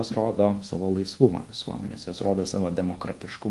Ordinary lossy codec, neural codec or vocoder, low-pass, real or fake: Opus, 24 kbps; codec, 24 kHz, 1.2 kbps, DualCodec; 10.8 kHz; fake